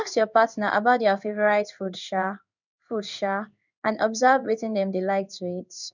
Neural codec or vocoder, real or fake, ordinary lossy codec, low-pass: codec, 16 kHz in and 24 kHz out, 1 kbps, XY-Tokenizer; fake; none; 7.2 kHz